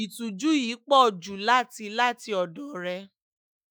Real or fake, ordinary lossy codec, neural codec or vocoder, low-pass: fake; none; autoencoder, 48 kHz, 128 numbers a frame, DAC-VAE, trained on Japanese speech; none